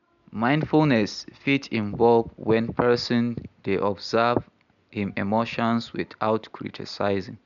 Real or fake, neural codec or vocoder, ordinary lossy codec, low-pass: real; none; none; 7.2 kHz